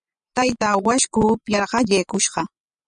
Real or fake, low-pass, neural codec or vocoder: fake; 10.8 kHz; vocoder, 24 kHz, 100 mel bands, Vocos